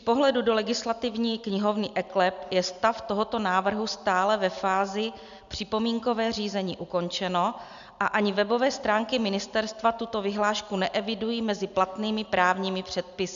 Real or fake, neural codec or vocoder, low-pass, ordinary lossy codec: real; none; 7.2 kHz; AAC, 96 kbps